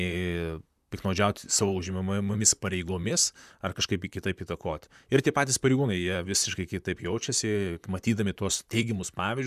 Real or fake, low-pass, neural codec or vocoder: fake; 14.4 kHz; vocoder, 44.1 kHz, 128 mel bands, Pupu-Vocoder